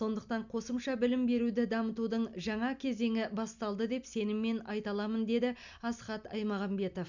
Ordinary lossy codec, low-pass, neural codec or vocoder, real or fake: none; 7.2 kHz; none; real